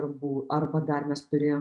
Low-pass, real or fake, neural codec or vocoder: 10.8 kHz; fake; vocoder, 48 kHz, 128 mel bands, Vocos